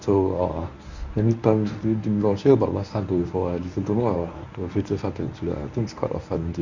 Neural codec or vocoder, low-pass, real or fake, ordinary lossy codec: codec, 24 kHz, 0.9 kbps, WavTokenizer, medium speech release version 1; 7.2 kHz; fake; Opus, 64 kbps